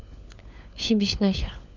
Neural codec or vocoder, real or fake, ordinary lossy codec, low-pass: codec, 16 kHz, 4 kbps, FunCodec, trained on LibriTTS, 50 frames a second; fake; none; 7.2 kHz